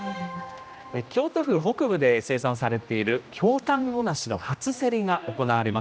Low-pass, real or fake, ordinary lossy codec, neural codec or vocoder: none; fake; none; codec, 16 kHz, 1 kbps, X-Codec, HuBERT features, trained on general audio